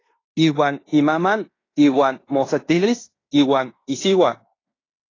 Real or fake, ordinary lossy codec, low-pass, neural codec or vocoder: fake; AAC, 32 kbps; 7.2 kHz; codec, 16 kHz in and 24 kHz out, 0.9 kbps, LongCat-Audio-Codec, fine tuned four codebook decoder